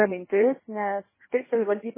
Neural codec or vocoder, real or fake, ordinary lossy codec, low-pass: codec, 16 kHz in and 24 kHz out, 1.1 kbps, FireRedTTS-2 codec; fake; MP3, 16 kbps; 3.6 kHz